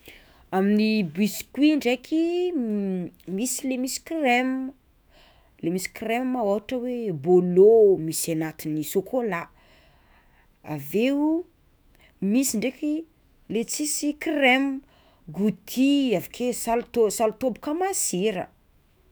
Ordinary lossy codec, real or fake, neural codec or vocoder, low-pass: none; fake; autoencoder, 48 kHz, 128 numbers a frame, DAC-VAE, trained on Japanese speech; none